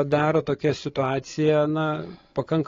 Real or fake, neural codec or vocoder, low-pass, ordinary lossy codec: fake; codec, 16 kHz, 8 kbps, FreqCodec, larger model; 7.2 kHz; AAC, 32 kbps